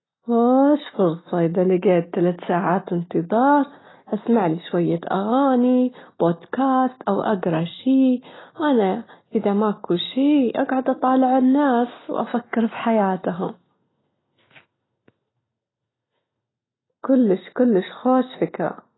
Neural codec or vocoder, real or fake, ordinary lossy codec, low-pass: none; real; AAC, 16 kbps; 7.2 kHz